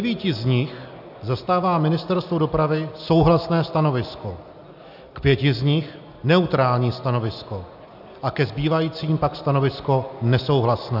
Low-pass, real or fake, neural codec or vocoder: 5.4 kHz; real; none